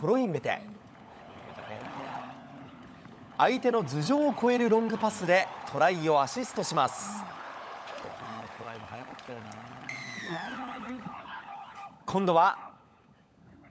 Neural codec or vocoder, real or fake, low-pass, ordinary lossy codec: codec, 16 kHz, 16 kbps, FunCodec, trained on LibriTTS, 50 frames a second; fake; none; none